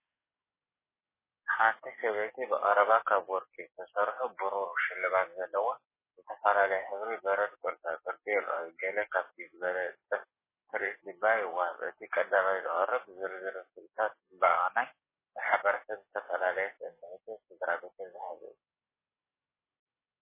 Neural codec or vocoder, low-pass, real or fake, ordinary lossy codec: codec, 44.1 kHz, 7.8 kbps, DAC; 3.6 kHz; fake; MP3, 16 kbps